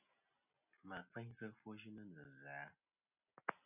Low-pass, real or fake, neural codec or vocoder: 3.6 kHz; real; none